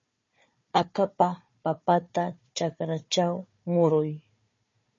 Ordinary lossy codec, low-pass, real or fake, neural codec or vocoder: MP3, 32 kbps; 7.2 kHz; fake; codec, 16 kHz, 4 kbps, FunCodec, trained on Chinese and English, 50 frames a second